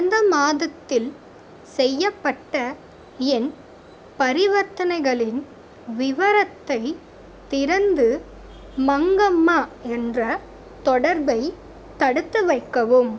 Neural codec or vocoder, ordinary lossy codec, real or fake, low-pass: none; none; real; none